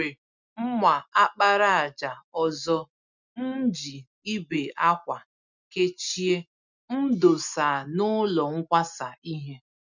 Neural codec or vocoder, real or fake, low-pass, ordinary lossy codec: none; real; 7.2 kHz; none